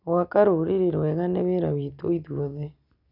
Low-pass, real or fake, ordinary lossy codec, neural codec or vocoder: 5.4 kHz; real; none; none